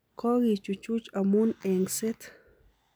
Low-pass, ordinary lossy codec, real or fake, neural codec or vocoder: none; none; real; none